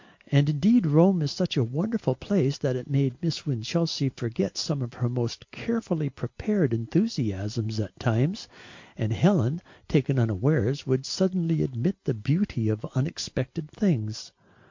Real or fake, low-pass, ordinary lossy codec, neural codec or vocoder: real; 7.2 kHz; MP3, 48 kbps; none